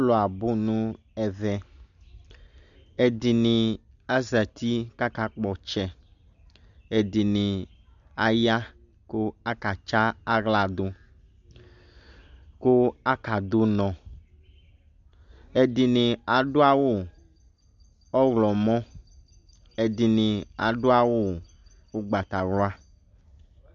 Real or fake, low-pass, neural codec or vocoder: real; 7.2 kHz; none